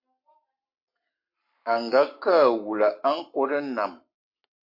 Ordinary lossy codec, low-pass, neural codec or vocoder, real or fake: MP3, 32 kbps; 5.4 kHz; codec, 44.1 kHz, 7.8 kbps, Pupu-Codec; fake